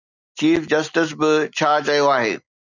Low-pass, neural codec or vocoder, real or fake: 7.2 kHz; none; real